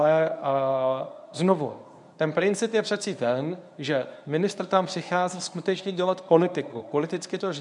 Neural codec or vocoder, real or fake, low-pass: codec, 24 kHz, 0.9 kbps, WavTokenizer, medium speech release version 1; fake; 10.8 kHz